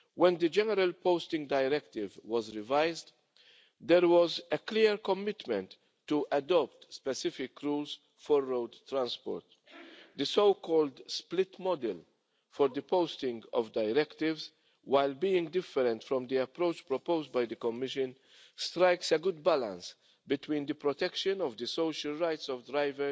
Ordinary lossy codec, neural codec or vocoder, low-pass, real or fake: none; none; none; real